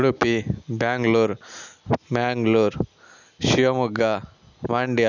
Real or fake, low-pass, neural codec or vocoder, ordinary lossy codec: real; 7.2 kHz; none; none